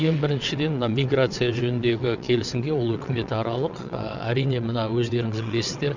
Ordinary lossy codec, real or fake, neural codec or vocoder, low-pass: none; fake; vocoder, 22.05 kHz, 80 mel bands, WaveNeXt; 7.2 kHz